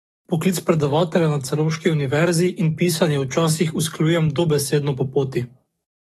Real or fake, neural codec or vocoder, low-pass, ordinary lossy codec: fake; codec, 44.1 kHz, 7.8 kbps, DAC; 19.8 kHz; AAC, 32 kbps